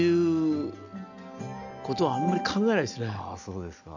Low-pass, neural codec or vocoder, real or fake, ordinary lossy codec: 7.2 kHz; none; real; none